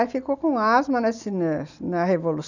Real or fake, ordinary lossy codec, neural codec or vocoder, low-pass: real; none; none; 7.2 kHz